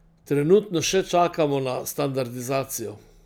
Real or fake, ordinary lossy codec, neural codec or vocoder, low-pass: real; none; none; none